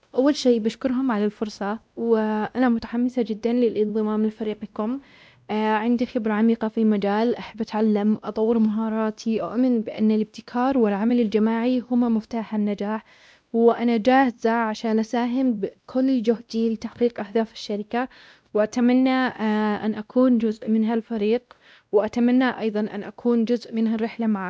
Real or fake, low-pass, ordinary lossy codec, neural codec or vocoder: fake; none; none; codec, 16 kHz, 1 kbps, X-Codec, WavLM features, trained on Multilingual LibriSpeech